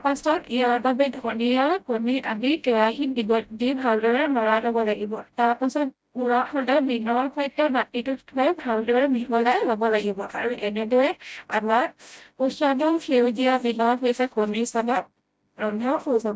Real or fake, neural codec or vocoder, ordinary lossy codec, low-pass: fake; codec, 16 kHz, 0.5 kbps, FreqCodec, smaller model; none; none